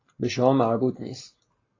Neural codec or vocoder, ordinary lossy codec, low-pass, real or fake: none; AAC, 32 kbps; 7.2 kHz; real